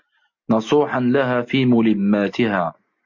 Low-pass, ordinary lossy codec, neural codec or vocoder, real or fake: 7.2 kHz; MP3, 48 kbps; none; real